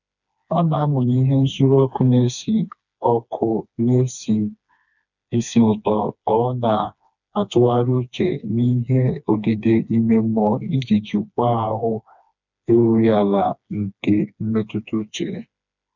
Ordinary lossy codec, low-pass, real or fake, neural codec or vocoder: none; 7.2 kHz; fake; codec, 16 kHz, 2 kbps, FreqCodec, smaller model